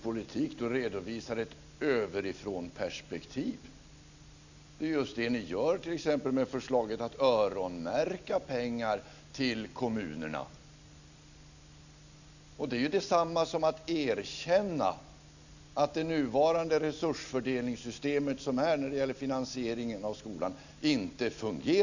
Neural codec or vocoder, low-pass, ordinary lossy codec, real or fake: none; 7.2 kHz; none; real